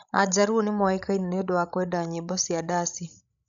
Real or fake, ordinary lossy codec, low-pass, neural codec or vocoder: fake; none; 7.2 kHz; codec, 16 kHz, 16 kbps, FreqCodec, larger model